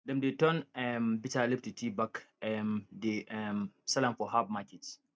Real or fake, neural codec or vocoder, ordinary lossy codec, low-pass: real; none; none; none